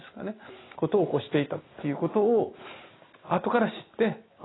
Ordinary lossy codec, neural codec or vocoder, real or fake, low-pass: AAC, 16 kbps; none; real; 7.2 kHz